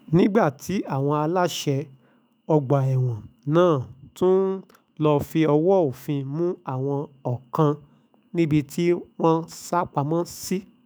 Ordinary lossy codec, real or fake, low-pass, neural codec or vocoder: none; fake; none; autoencoder, 48 kHz, 128 numbers a frame, DAC-VAE, trained on Japanese speech